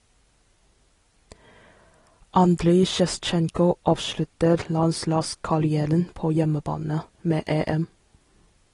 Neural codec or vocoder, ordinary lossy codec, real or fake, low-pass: none; AAC, 32 kbps; real; 10.8 kHz